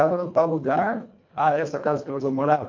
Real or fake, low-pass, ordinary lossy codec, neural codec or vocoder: fake; 7.2 kHz; MP3, 48 kbps; codec, 24 kHz, 1.5 kbps, HILCodec